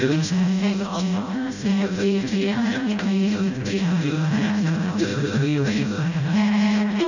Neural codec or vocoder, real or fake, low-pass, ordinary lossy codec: codec, 16 kHz, 0.5 kbps, FreqCodec, smaller model; fake; 7.2 kHz; none